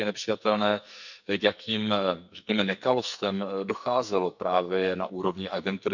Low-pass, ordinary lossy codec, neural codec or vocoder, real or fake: 7.2 kHz; none; codec, 44.1 kHz, 2.6 kbps, SNAC; fake